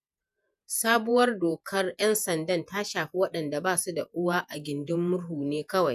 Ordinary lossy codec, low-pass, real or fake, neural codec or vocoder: none; 14.4 kHz; fake; vocoder, 48 kHz, 128 mel bands, Vocos